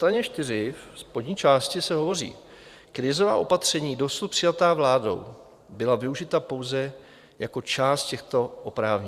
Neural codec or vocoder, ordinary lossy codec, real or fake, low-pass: vocoder, 44.1 kHz, 128 mel bands every 512 samples, BigVGAN v2; Opus, 64 kbps; fake; 14.4 kHz